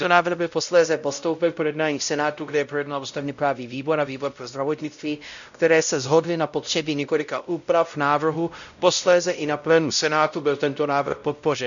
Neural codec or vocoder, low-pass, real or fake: codec, 16 kHz, 0.5 kbps, X-Codec, WavLM features, trained on Multilingual LibriSpeech; 7.2 kHz; fake